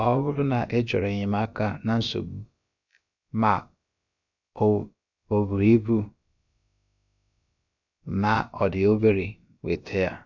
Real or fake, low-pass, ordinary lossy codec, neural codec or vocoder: fake; 7.2 kHz; none; codec, 16 kHz, about 1 kbps, DyCAST, with the encoder's durations